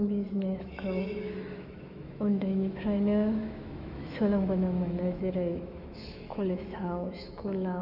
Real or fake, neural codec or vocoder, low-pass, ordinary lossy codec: fake; autoencoder, 48 kHz, 128 numbers a frame, DAC-VAE, trained on Japanese speech; 5.4 kHz; none